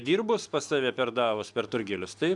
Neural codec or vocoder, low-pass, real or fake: none; 10.8 kHz; real